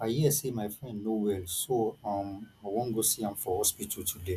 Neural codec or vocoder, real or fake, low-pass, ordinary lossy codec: none; real; 14.4 kHz; none